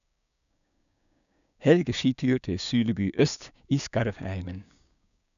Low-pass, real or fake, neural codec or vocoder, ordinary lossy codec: 7.2 kHz; fake; codec, 16 kHz, 6 kbps, DAC; none